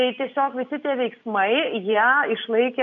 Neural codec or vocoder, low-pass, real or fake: none; 7.2 kHz; real